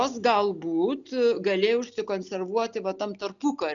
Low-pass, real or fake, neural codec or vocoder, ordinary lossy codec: 7.2 kHz; real; none; MP3, 96 kbps